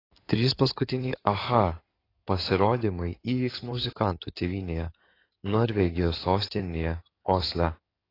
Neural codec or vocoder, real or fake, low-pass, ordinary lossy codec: codec, 16 kHz in and 24 kHz out, 2.2 kbps, FireRedTTS-2 codec; fake; 5.4 kHz; AAC, 24 kbps